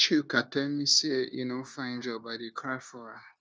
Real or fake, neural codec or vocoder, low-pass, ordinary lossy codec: fake; codec, 16 kHz, 0.9 kbps, LongCat-Audio-Codec; none; none